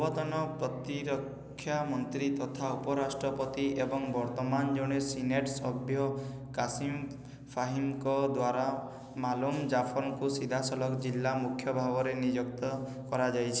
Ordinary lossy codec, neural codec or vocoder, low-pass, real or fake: none; none; none; real